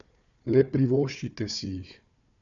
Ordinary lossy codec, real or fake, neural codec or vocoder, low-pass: none; fake; codec, 16 kHz, 4 kbps, FunCodec, trained on Chinese and English, 50 frames a second; 7.2 kHz